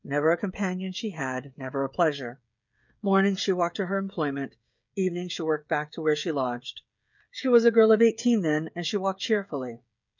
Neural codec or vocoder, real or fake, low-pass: codec, 16 kHz, 16 kbps, FreqCodec, smaller model; fake; 7.2 kHz